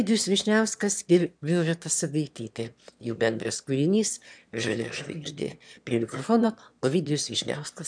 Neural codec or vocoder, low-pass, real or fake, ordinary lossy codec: autoencoder, 22.05 kHz, a latent of 192 numbers a frame, VITS, trained on one speaker; 9.9 kHz; fake; MP3, 96 kbps